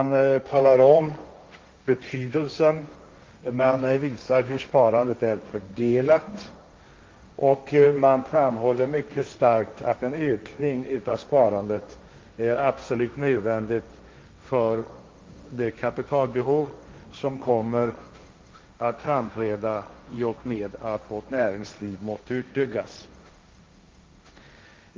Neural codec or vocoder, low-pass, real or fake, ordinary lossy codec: codec, 16 kHz, 1.1 kbps, Voila-Tokenizer; 7.2 kHz; fake; Opus, 32 kbps